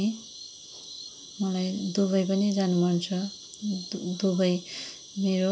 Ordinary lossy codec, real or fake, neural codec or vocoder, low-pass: none; real; none; none